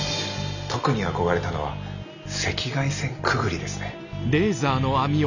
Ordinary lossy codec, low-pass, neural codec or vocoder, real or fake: none; 7.2 kHz; none; real